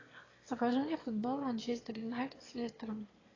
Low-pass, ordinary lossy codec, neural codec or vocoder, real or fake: 7.2 kHz; AAC, 32 kbps; autoencoder, 22.05 kHz, a latent of 192 numbers a frame, VITS, trained on one speaker; fake